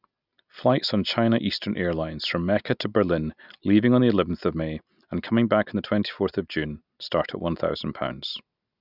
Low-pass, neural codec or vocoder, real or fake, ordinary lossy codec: 5.4 kHz; none; real; none